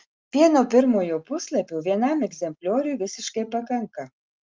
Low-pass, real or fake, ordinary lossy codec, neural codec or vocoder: 7.2 kHz; real; Opus, 32 kbps; none